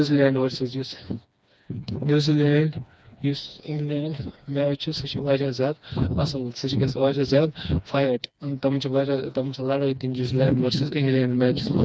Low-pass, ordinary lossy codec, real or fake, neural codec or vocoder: none; none; fake; codec, 16 kHz, 2 kbps, FreqCodec, smaller model